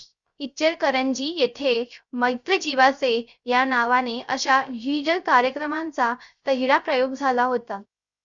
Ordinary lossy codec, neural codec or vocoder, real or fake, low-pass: Opus, 64 kbps; codec, 16 kHz, 0.3 kbps, FocalCodec; fake; 7.2 kHz